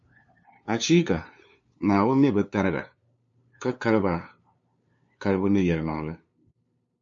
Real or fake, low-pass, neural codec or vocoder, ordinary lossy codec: fake; 7.2 kHz; codec, 16 kHz, 2 kbps, FunCodec, trained on LibriTTS, 25 frames a second; MP3, 48 kbps